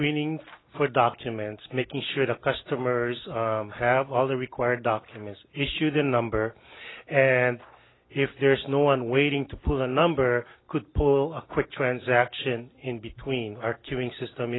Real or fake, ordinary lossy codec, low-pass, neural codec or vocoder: real; AAC, 16 kbps; 7.2 kHz; none